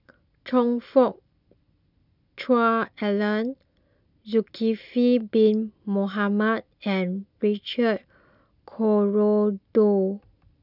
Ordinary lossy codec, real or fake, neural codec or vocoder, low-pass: none; real; none; 5.4 kHz